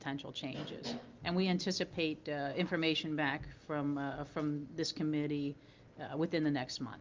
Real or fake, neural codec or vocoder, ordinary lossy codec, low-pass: real; none; Opus, 24 kbps; 7.2 kHz